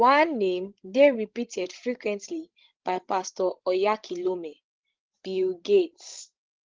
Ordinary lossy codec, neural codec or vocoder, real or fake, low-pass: Opus, 16 kbps; codec, 16 kHz, 16 kbps, FreqCodec, larger model; fake; 7.2 kHz